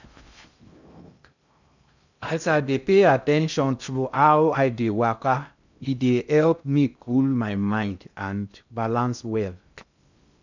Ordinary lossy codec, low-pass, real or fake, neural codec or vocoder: none; 7.2 kHz; fake; codec, 16 kHz in and 24 kHz out, 0.6 kbps, FocalCodec, streaming, 2048 codes